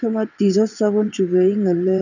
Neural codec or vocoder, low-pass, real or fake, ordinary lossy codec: vocoder, 22.05 kHz, 80 mel bands, WaveNeXt; 7.2 kHz; fake; none